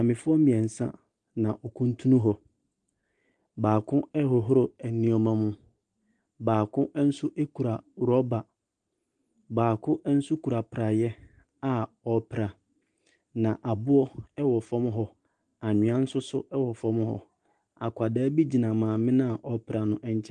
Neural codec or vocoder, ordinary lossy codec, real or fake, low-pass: none; Opus, 24 kbps; real; 10.8 kHz